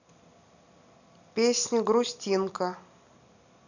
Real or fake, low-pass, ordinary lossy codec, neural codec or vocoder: real; 7.2 kHz; none; none